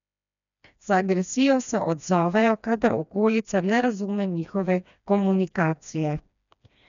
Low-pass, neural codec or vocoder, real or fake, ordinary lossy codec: 7.2 kHz; codec, 16 kHz, 2 kbps, FreqCodec, smaller model; fake; none